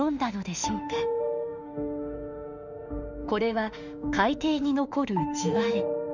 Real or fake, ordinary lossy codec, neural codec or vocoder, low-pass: fake; none; autoencoder, 48 kHz, 32 numbers a frame, DAC-VAE, trained on Japanese speech; 7.2 kHz